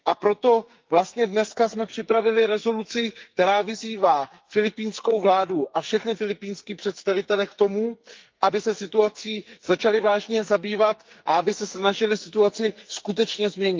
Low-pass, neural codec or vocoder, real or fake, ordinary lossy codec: 7.2 kHz; codec, 44.1 kHz, 2.6 kbps, SNAC; fake; Opus, 24 kbps